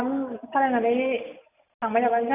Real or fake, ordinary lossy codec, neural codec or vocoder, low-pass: real; none; none; 3.6 kHz